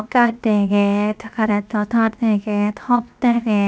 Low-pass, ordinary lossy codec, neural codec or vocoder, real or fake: none; none; codec, 16 kHz, 0.7 kbps, FocalCodec; fake